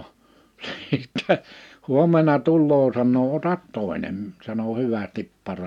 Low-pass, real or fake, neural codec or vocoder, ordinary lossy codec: 19.8 kHz; real; none; none